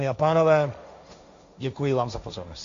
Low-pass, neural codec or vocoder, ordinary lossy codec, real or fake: 7.2 kHz; codec, 16 kHz, 1.1 kbps, Voila-Tokenizer; AAC, 64 kbps; fake